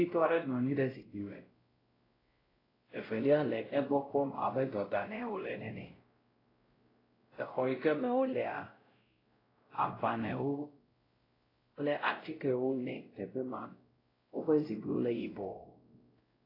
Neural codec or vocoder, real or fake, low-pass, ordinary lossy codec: codec, 16 kHz, 0.5 kbps, X-Codec, WavLM features, trained on Multilingual LibriSpeech; fake; 5.4 kHz; AAC, 24 kbps